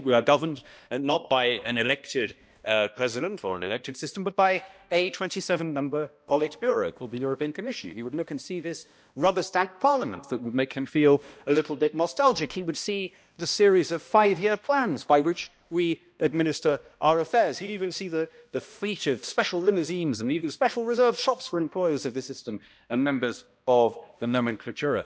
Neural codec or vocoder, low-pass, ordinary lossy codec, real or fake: codec, 16 kHz, 1 kbps, X-Codec, HuBERT features, trained on balanced general audio; none; none; fake